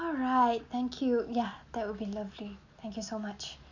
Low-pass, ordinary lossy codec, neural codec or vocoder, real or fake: 7.2 kHz; none; none; real